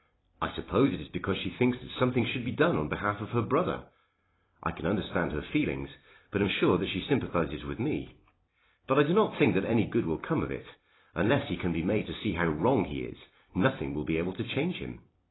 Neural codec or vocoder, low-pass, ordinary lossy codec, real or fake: vocoder, 44.1 kHz, 128 mel bands every 256 samples, BigVGAN v2; 7.2 kHz; AAC, 16 kbps; fake